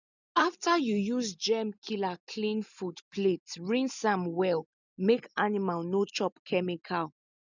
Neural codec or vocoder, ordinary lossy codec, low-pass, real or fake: none; none; 7.2 kHz; real